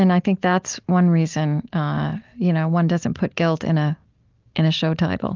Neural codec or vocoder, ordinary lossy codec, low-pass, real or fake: none; Opus, 24 kbps; 7.2 kHz; real